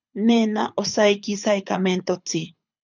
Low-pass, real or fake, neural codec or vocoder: 7.2 kHz; fake; codec, 24 kHz, 6 kbps, HILCodec